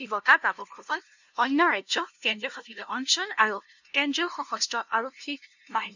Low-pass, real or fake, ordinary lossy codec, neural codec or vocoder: 7.2 kHz; fake; none; codec, 16 kHz, 0.5 kbps, FunCodec, trained on LibriTTS, 25 frames a second